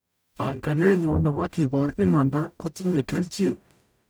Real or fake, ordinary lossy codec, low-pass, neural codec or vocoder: fake; none; none; codec, 44.1 kHz, 0.9 kbps, DAC